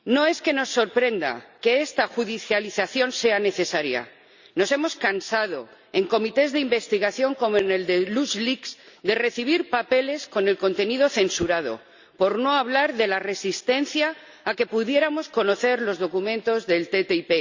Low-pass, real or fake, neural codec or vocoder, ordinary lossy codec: 7.2 kHz; real; none; Opus, 64 kbps